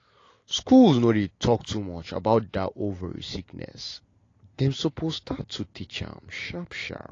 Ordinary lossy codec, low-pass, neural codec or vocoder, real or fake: AAC, 32 kbps; 7.2 kHz; none; real